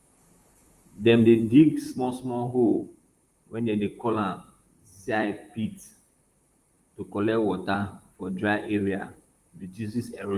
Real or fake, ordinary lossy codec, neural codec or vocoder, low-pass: fake; Opus, 32 kbps; vocoder, 44.1 kHz, 128 mel bands, Pupu-Vocoder; 14.4 kHz